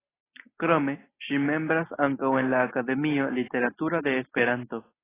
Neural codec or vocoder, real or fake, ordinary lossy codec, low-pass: none; real; AAC, 16 kbps; 3.6 kHz